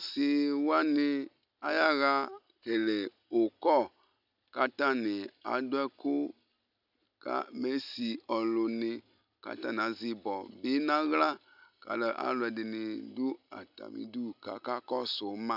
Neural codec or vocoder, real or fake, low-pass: none; real; 5.4 kHz